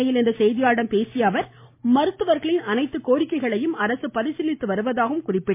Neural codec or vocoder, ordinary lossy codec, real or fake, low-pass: none; MP3, 24 kbps; real; 3.6 kHz